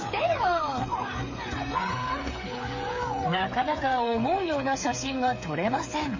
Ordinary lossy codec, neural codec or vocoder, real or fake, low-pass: MP3, 32 kbps; codec, 16 kHz, 8 kbps, FreqCodec, smaller model; fake; 7.2 kHz